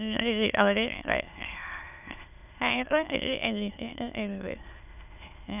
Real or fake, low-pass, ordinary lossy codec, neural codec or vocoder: fake; 3.6 kHz; none; autoencoder, 22.05 kHz, a latent of 192 numbers a frame, VITS, trained on many speakers